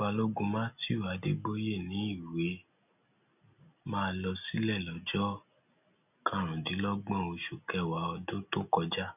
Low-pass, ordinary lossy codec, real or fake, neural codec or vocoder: 3.6 kHz; none; real; none